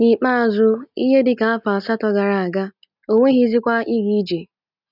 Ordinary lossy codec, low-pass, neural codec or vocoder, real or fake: none; 5.4 kHz; none; real